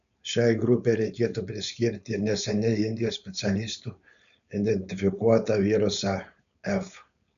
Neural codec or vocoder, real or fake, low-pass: codec, 16 kHz, 4.8 kbps, FACodec; fake; 7.2 kHz